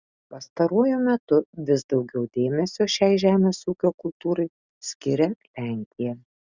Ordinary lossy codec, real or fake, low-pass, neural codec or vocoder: Opus, 64 kbps; real; 7.2 kHz; none